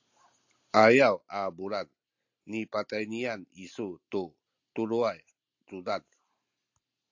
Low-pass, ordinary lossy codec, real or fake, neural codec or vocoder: 7.2 kHz; AAC, 48 kbps; real; none